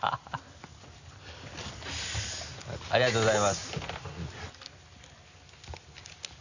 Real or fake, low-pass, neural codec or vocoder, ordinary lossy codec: real; 7.2 kHz; none; none